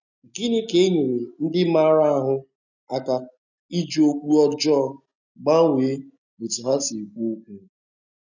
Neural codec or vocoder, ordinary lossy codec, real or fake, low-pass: none; none; real; 7.2 kHz